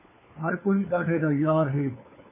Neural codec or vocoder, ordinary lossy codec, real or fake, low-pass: codec, 16 kHz, 4 kbps, FunCodec, trained on LibriTTS, 50 frames a second; MP3, 16 kbps; fake; 3.6 kHz